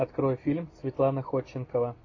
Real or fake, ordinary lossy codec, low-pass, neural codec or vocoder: real; AAC, 32 kbps; 7.2 kHz; none